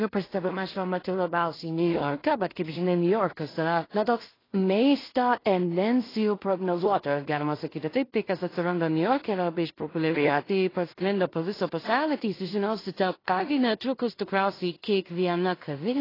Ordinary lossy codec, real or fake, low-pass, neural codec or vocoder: AAC, 24 kbps; fake; 5.4 kHz; codec, 16 kHz in and 24 kHz out, 0.4 kbps, LongCat-Audio-Codec, two codebook decoder